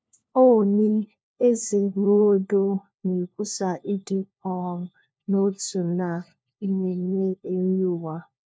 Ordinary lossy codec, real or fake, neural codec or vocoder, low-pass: none; fake; codec, 16 kHz, 1 kbps, FunCodec, trained on LibriTTS, 50 frames a second; none